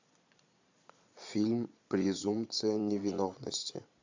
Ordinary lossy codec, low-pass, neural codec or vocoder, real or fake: AAC, 48 kbps; 7.2 kHz; vocoder, 22.05 kHz, 80 mel bands, Vocos; fake